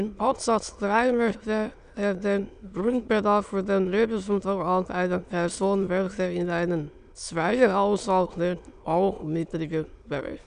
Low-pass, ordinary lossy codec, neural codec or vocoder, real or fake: 9.9 kHz; none; autoencoder, 22.05 kHz, a latent of 192 numbers a frame, VITS, trained on many speakers; fake